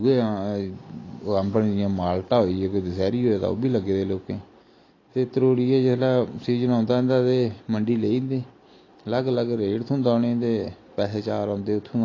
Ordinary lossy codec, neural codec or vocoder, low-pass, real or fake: AAC, 32 kbps; none; 7.2 kHz; real